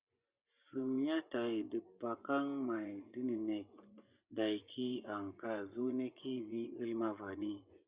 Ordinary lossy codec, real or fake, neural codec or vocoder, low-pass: Opus, 64 kbps; fake; vocoder, 24 kHz, 100 mel bands, Vocos; 3.6 kHz